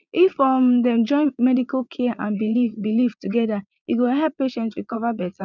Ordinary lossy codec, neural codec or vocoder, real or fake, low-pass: none; vocoder, 22.05 kHz, 80 mel bands, Vocos; fake; 7.2 kHz